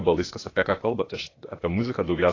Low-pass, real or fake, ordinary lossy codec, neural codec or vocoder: 7.2 kHz; fake; AAC, 32 kbps; codec, 16 kHz, 0.8 kbps, ZipCodec